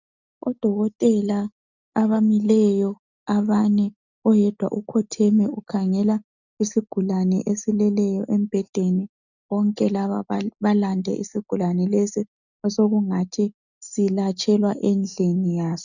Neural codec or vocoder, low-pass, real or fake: none; 7.2 kHz; real